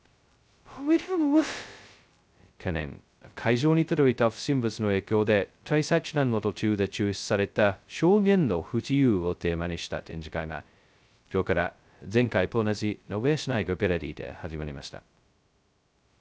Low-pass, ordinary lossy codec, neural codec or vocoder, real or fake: none; none; codec, 16 kHz, 0.2 kbps, FocalCodec; fake